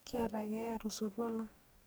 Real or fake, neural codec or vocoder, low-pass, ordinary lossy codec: fake; codec, 44.1 kHz, 2.6 kbps, DAC; none; none